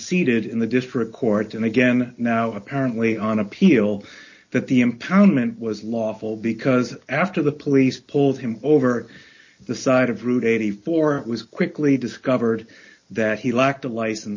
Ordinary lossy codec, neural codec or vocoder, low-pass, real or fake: MP3, 32 kbps; none; 7.2 kHz; real